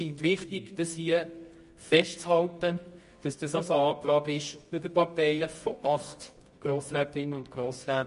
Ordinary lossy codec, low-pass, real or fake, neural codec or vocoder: MP3, 48 kbps; 10.8 kHz; fake; codec, 24 kHz, 0.9 kbps, WavTokenizer, medium music audio release